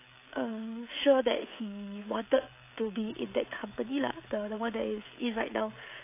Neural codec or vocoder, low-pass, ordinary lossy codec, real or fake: codec, 16 kHz, 16 kbps, FreqCodec, smaller model; 3.6 kHz; AAC, 24 kbps; fake